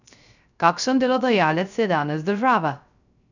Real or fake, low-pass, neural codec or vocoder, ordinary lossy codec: fake; 7.2 kHz; codec, 16 kHz, 0.3 kbps, FocalCodec; none